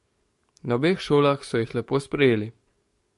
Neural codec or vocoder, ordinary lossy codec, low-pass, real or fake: codec, 44.1 kHz, 7.8 kbps, DAC; MP3, 48 kbps; 14.4 kHz; fake